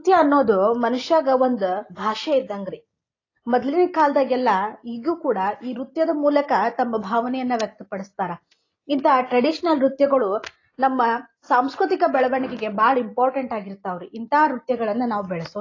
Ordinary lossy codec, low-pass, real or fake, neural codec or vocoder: AAC, 32 kbps; 7.2 kHz; real; none